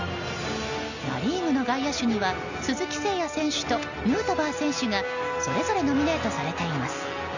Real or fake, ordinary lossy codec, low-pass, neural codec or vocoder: real; none; 7.2 kHz; none